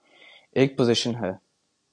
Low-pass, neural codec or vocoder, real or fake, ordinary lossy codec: 9.9 kHz; none; real; AAC, 64 kbps